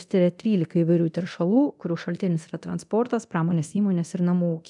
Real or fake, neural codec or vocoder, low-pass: fake; codec, 24 kHz, 0.9 kbps, DualCodec; 10.8 kHz